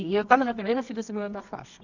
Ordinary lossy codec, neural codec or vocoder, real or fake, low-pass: none; codec, 24 kHz, 0.9 kbps, WavTokenizer, medium music audio release; fake; 7.2 kHz